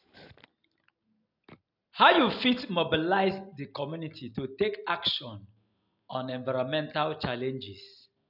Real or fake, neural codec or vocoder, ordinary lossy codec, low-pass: real; none; none; 5.4 kHz